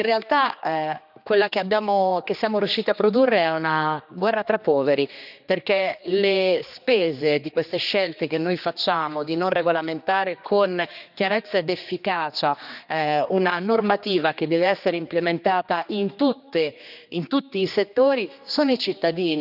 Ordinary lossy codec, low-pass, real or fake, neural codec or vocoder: none; 5.4 kHz; fake; codec, 16 kHz, 2 kbps, X-Codec, HuBERT features, trained on general audio